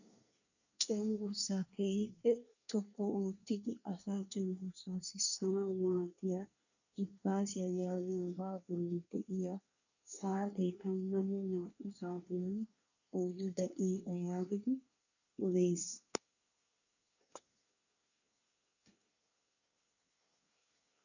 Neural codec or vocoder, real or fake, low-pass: codec, 24 kHz, 1 kbps, SNAC; fake; 7.2 kHz